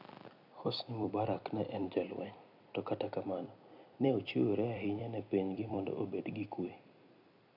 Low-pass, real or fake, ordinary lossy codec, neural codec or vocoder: 5.4 kHz; fake; none; vocoder, 44.1 kHz, 128 mel bands every 256 samples, BigVGAN v2